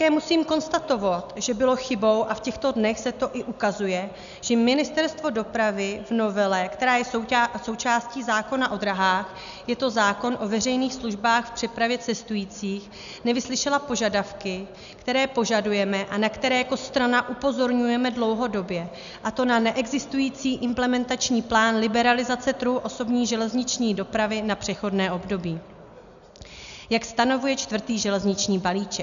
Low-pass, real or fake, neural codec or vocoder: 7.2 kHz; real; none